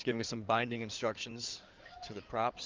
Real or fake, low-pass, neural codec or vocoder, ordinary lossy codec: fake; 7.2 kHz; codec, 16 kHz in and 24 kHz out, 2.2 kbps, FireRedTTS-2 codec; Opus, 32 kbps